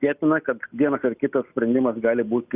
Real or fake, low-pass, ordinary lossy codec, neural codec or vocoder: real; 3.6 kHz; Opus, 64 kbps; none